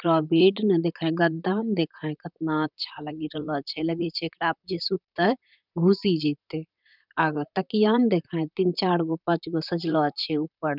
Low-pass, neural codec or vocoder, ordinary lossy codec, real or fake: 5.4 kHz; vocoder, 44.1 kHz, 128 mel bands, Pupu-Vocoder; none; fake